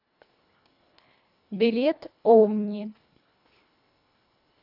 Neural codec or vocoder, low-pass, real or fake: codec, 24 kHz, 1.5 kbps, HILCodec; 5.4 kHz; fake